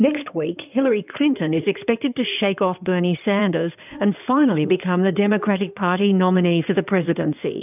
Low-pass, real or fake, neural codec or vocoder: 3.6 kHz; fake; codec, 16 kHz in and 24 kHz out, 2.2 kbps, FireRedTTS-2 codec